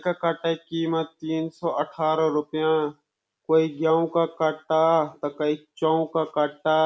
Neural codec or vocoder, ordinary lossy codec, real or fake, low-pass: none; none; real; none